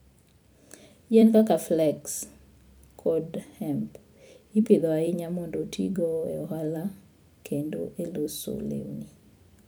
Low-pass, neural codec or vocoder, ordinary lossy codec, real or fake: none; vocoder, 44.1 kHz, 128 mel bands every 256 samples, BigVGAN v2; none; fake